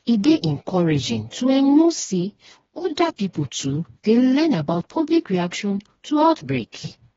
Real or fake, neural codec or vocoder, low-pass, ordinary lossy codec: fake; codec, 16 kHz, 2 kbps, FreqCodec, smaller model; 7.2 kHz; AAC, 24 kbps